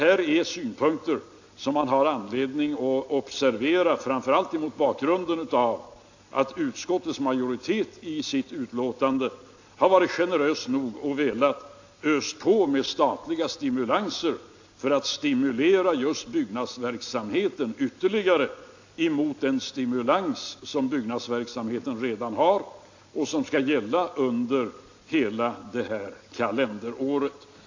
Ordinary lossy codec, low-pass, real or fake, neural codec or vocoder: AAC, 48 kbps; 7.2 kHz; real; none